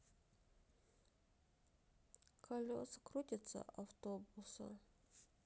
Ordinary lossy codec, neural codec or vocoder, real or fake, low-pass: none; none; real; none